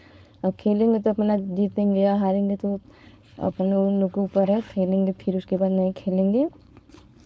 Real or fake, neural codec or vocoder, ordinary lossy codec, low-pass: fake; codec, 16 kHz, 4.8 kbps, FACodec; none; none